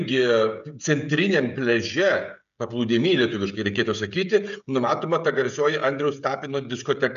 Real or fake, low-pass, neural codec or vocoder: fake; 7.2 kHz; codec, 16 kHz, 16 kbps, FreqCodec, smaller model